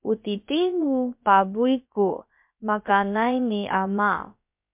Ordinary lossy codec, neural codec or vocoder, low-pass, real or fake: MP3, 32 kbps; codec, 16 kHz, about 1 kbps, DyCAST, with the encoder's durations; 3.6 kHz; fake